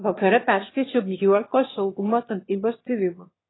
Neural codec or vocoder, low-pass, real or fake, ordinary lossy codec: autoencoder, 22.05 kHz, a latent of 192 numbers a frame, VITS, trained on one speaker; 7.2 kHz; fake; AAC, 16 kbps